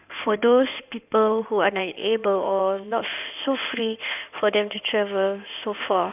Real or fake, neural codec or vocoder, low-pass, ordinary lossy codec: fake; codec, 16 kHz in and 24 kHz out, 2.2 kbps, FireRedTTS-2 codec; 3.6 kHz; none